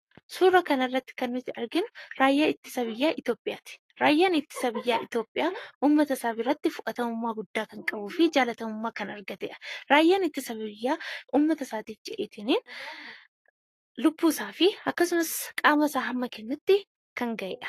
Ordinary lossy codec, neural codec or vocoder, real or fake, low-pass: AAC, 48 kbps; codec, 44.1 kHz, 7.8 kbps, Pupu-Codec; fake; 14.4 kHz